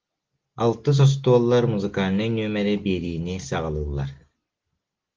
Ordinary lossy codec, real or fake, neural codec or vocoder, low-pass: Opus, 16 kbps; real; none; 7.2 kHz